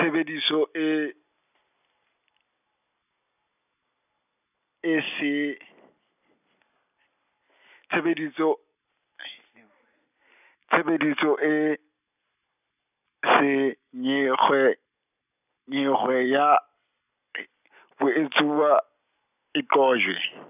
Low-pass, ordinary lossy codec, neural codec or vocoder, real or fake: 3.6 kHz; none; none; real